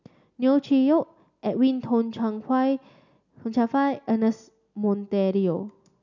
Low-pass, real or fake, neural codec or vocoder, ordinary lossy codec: 7.2 kHz; real; none; none